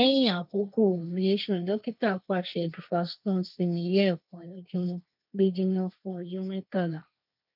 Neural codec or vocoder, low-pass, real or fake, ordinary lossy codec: codec, 16 kHz, 1.1 kbps, Voila-Tokenizer; 5.4 kHz; fake; none